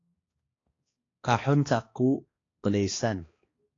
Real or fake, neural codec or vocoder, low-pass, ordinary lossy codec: fake; codec, 16 kHz, 2 kbps, X-Codec, HuBERT features, trained on balanced general audio; 7.2 kHz; AAC, 32 kbps